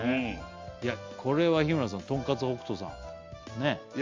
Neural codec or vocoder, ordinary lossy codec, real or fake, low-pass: none; Opus, 32 kbps; real; 7.2 kHz